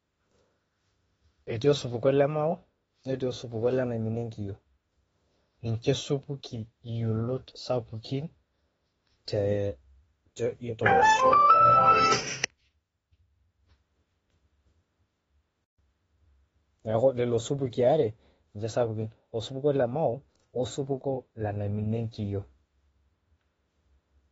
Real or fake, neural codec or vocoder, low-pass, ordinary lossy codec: fake; autoencoder, 48 kHz, 32 numbers a frame, DAC-VAE, trained on Japanese speech; 19.8 kHz; AAC, 24 kbps